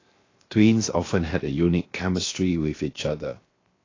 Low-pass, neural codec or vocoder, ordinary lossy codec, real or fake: 7.2 kHz; codec, 16 kHz, 0.7 kbps, FocalCodec; AAC, 32 kbps; fake